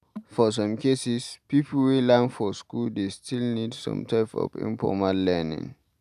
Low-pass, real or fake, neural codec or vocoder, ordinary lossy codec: 14.4 kHz; real; none; none